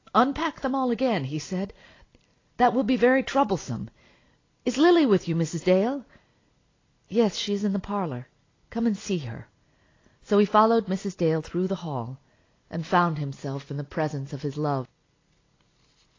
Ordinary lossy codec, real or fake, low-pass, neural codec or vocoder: AAC, 32 kbps; real; 7.2 kHz; none